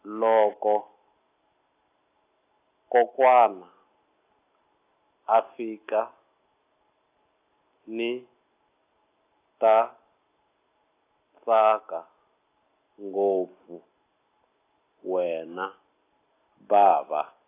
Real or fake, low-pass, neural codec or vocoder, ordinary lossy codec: real; 3.6 kHz; none; AAC, 24 kbps